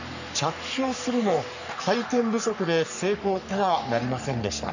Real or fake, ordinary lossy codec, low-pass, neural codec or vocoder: fake; none; 7.2 kHz; codec, 44.1 kHz, 3.4 kbps, Pupu-Codec